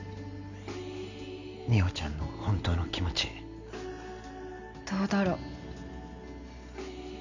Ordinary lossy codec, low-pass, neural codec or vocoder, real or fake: none; 7.2 kHz; none; real